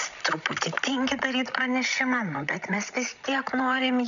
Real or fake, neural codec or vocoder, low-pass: fake; codec, 16 kHz, 16 kbps, FreqCodec, larger model; 7.2 kHz